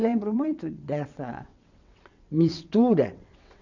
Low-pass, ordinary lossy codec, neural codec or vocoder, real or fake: 7.2 kHz; none; vocoder, 22.05 kHz, 80 mel bands, WaveNeXt; fake